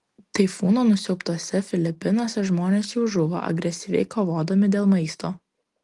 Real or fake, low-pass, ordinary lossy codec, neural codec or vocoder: real; 10.8 kHz; Opus, 24 kbps; none